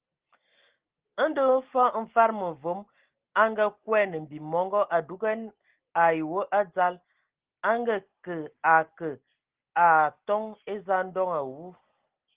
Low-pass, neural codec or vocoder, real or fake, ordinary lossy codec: 3.6 kHz; none; real; Opus, 16 kbps